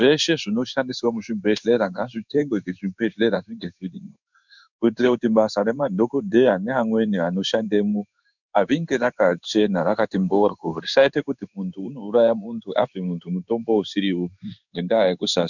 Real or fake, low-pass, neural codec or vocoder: fake; 7.2 kHz; codec, 16 kHz in and 24 kHz out, 1 kbps, XY-Tokenizer